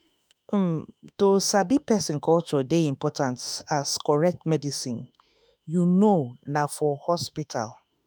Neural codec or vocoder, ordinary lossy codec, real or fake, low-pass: autoencoder, 48 kHz, 32 numbers a frame, DAC-VAE, trained on Japanese speech; none; fake; none